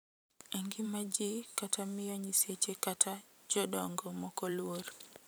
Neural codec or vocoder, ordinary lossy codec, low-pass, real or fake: vocoder, 44.1 kHz, 128 mel bands every 512 samples, BigVGAN v2; none; none; fake